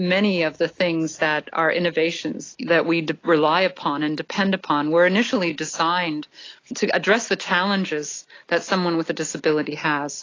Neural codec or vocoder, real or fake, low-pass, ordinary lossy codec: none; real; 7.2 kHz; AAC, 32 kbps